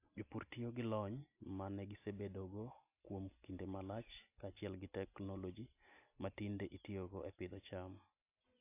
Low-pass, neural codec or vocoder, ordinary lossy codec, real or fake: 3.6 kHz; none; AAC, 32 kbps; real